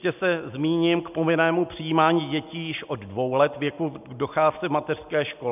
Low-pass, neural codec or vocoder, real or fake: 3.6 kHz; none; real